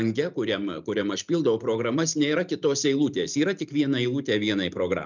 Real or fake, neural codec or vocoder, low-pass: real; none; 7.2 kHz